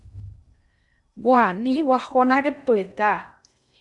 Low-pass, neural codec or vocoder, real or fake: 10.8 kHz; codec, 16 kHz in and 24 kHz out, 0.6 kbps, FocalCodec, streaming, 2048 codes; fake